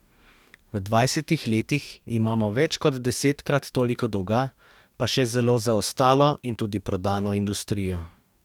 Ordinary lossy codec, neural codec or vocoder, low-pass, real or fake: none; codec, 44.1 kHz, 2.6 kbps, DAC; 19.8 kHz; fake